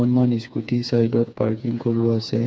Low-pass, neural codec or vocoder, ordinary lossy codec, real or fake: none; codec, 16 kHz, 4 kbps, FreqCodec, smaller model; none; fake